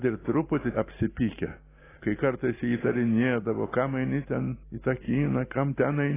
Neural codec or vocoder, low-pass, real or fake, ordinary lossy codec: none; 3.6 kHz; real; AAC, 16 kbps